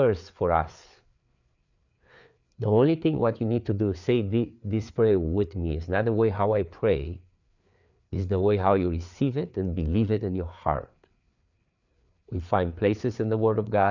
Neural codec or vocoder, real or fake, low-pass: codec, 16 kHz, 4 kbps, FreqCodec, larger model; fake; 7.2 kHz